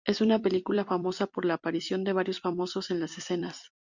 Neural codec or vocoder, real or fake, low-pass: vocoder, 44.1 kHz, 128 mel bands every 256 samples, BigVGAN v2; fake; 7.2 kHz